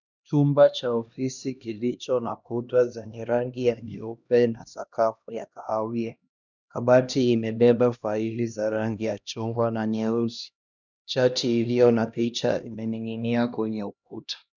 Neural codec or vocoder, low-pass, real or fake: codec, 16 kHz, 1 kbps, X-Codec, HuBERT features, trained on LibriSpeech; 7.2 kHz; fake